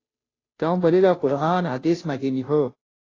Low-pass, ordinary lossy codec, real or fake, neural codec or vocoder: 7.2 kHz; AAC, 32 kbps; fake; codec, 16 kHz, 0.5 kbps, FunCodec, trained on Chinese and English, 25 frames a second